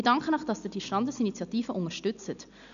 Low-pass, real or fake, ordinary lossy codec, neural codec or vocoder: 7.2 kHz; real; none; none